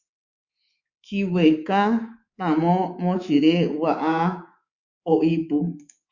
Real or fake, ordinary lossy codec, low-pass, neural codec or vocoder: fake; Opus, 64 kbps; 7.2 kHz; codec, 24 kHz, 3.1 kbps, DualCodec